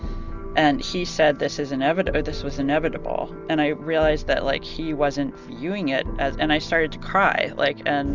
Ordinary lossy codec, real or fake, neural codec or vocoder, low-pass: Opus, 64 kbps; real; none; 7.2 kHz